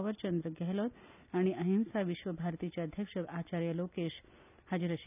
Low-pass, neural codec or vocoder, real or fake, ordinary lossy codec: 3.6 kHz; none; real; none